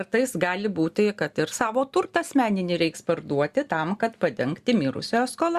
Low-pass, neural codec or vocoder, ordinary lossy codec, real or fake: 14.4 kHz; none; Opus, 64 kbps; real